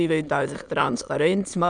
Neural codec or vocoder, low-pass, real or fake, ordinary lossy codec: autoencoder, 22.05 kHz, a latent of 192 numbers a frame, VITS, trained on many speakers; 9.9 kHz; fake; none